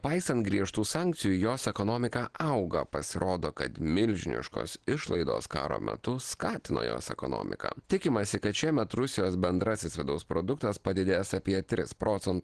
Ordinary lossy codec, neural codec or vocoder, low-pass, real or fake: Opus, 16 kbps; none; 9.9 kHz; real